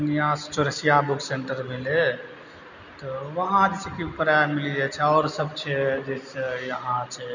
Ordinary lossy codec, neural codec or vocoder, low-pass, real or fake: none; none; 7.2 kHz; real